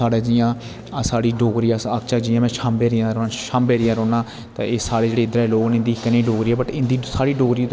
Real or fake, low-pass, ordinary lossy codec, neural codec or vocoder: real; none; none; none